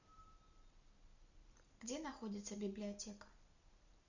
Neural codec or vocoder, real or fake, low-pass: none; real; 7.2 kHz